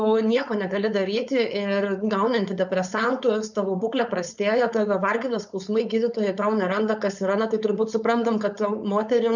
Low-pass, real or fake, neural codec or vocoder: 7.2 kHz; fake; codec, 16 kHz, 4.8 kbps, FACodec